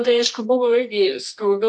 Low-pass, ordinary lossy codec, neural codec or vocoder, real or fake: 10.8 kHz; MP3, 64 kbps; codec, 24 kHz, 0.9 kbps, WavTokenizer, medium music audio release; fake